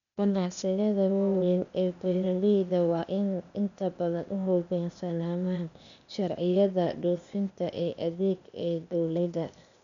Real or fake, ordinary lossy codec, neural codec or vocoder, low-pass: fake; none; codec, 16 kHz, 0.8 kbps, ZipCodec; 7.2 kHz